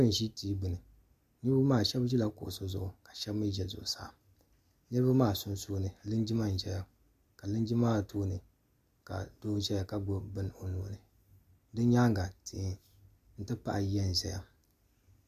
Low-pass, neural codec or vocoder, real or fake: 14.4 kHz; none; real